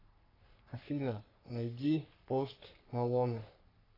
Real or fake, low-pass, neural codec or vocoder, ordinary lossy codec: fake; 5.4 kHz; codec, 44.1 kHz, 3.4 kbps, Pupu-Codec; AAC, 24 kbps